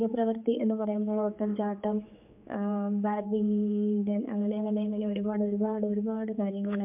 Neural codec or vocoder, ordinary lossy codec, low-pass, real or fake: codec, 16 kHz, 4 kbps, X-Codec, HuBERT features, trained on general audio; MP3, 32 kbps; 3.6 kHz; fake